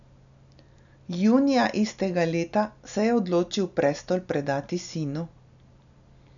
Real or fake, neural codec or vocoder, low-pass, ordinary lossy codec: real; none; 7.2 kHz; none